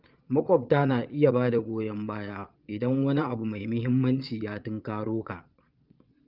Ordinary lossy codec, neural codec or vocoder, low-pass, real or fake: Opus, 32 kbps; vocoder, 22.05 kHz, 80 mel bands, Vocos; 5.4 kHz; fake